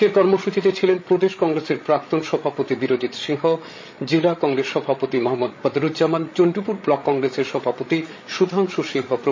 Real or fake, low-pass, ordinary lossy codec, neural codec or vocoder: fake; 7.2 kHz; MP3, 32 kbps; codec, 16 kHz, 16 kbps, FreqCodec, larger model